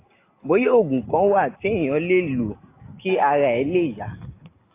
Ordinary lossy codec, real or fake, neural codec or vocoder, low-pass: AAC, 24 kbps; real; none; 3.6 kHz